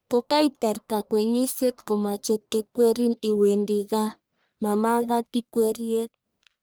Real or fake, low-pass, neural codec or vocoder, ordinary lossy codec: fake; none; codec, 44.1 kHz, 1.7 kbps, Pupu-Codec; none